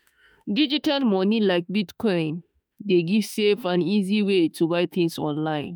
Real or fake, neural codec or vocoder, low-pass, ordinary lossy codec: fake; autoencoder, 48 kHz, 32 numbers a frame, DAC-VAE, trained on Japanese speech; none; none